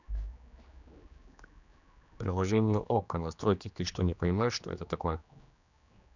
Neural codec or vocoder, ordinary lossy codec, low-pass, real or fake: codec, 16 kHz, 2 kbps, X-Codec, HuBERT features, trained on general audio; none; 7.2 kHz; fake